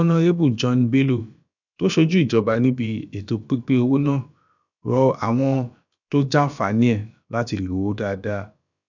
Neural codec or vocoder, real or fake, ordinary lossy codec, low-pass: codec, 16 kHz, about 1 kbps, DyCAST, with the encoder's durations; fake; none; 7.2 kHz